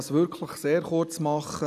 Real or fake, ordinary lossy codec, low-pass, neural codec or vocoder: real; none; 14.4 kHz; none